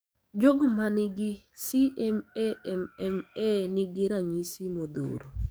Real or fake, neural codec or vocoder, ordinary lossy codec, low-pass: fake; codec, 44.1 kHz, 7.8 kbps, DAC; none; none